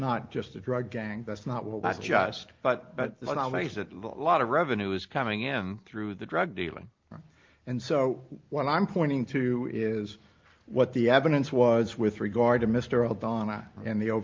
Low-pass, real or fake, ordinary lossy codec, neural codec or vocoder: 7.2 kHz; real; Opus, 32 kbps; none